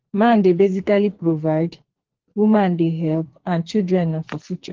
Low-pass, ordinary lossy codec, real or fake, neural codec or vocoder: 7.2 kHz; Opus, 16 kbps; fake; codec, 44.1 kHz, 2.6 kbps, SNAC